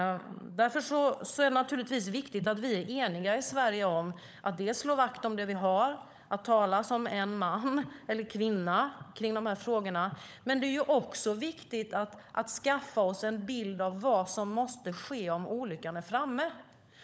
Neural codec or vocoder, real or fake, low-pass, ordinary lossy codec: codec, 16 kHz, 16 kbps, FunCodec, trained on LibriTTS, 50 frames a second; fake; none; none